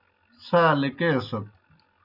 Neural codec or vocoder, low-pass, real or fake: none; 5.4 kHz; real